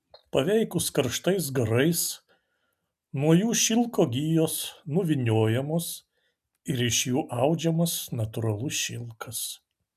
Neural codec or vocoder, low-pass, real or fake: none; 14.4 kHz; real